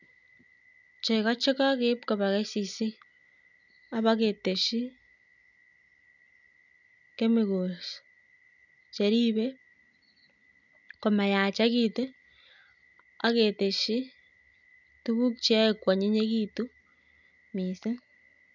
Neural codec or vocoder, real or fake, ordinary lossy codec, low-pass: none; real; none; 7.2 kHz